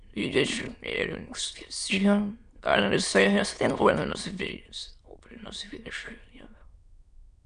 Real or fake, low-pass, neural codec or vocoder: fake; 9.9 kHz; autoencoder, 22.05 kHz, a latent of 192 numbers a frame, VITS, trained on many speakers